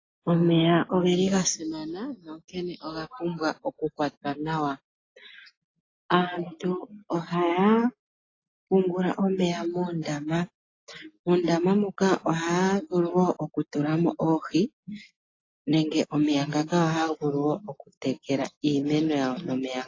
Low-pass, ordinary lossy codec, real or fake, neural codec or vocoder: 7.2 kHz; AAC, 32 kbps; real; none